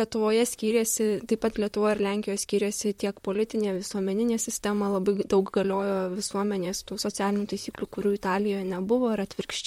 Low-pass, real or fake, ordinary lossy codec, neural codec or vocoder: 19.8 kHz; fake; MP3, 64 kbps; vocoder, 44.1 kHz, 128 mel bands, Pupu-Vocoder